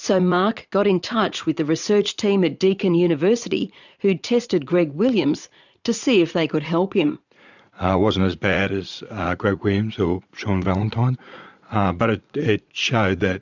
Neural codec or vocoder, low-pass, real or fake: vocoder, 44.1 kHz, 80 mel bands, Vocos; 7.2 kHz; fake